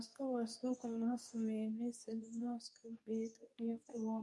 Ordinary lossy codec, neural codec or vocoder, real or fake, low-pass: none; codec, 24 kHz, 0.9 kbps, WavTokenizer, medium speech release version 2; fake; none